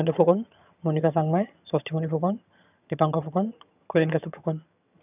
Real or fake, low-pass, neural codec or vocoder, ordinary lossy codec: fake; 3.6 kHz; vocoder, 22.05 kHz, 80 mel bands, HiFi-GAN; none